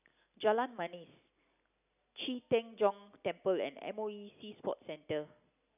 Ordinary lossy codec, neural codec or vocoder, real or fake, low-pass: none; none; real; 3.6 kHz